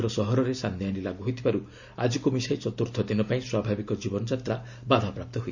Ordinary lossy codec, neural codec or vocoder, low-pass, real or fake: none; none; 7.2 kHz; real